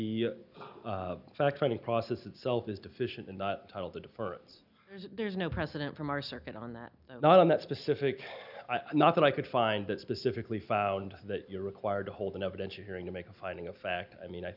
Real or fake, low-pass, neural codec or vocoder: real; 5.4 kHz; none